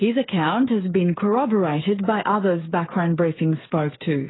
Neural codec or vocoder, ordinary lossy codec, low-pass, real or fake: codec, 16 kHz, 8 kbps, FreqCodec, smaller model; AAC, 16 kbps; 7.2 kHz; fake